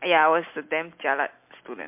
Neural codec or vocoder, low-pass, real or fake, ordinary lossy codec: none; 3.6 kHz; real; MP3, 32 kbps